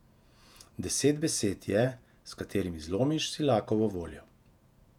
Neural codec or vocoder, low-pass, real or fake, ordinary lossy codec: vocoder, 48 kHz, 128 mel bands, Vocos; 19.8 kHz; fake; none